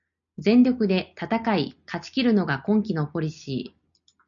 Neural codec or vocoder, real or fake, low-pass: none; real; 7.2 kHz